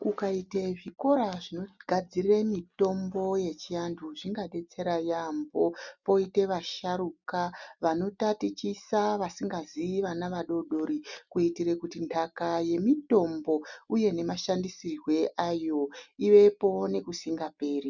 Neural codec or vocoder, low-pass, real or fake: none; 7.2 kHz; real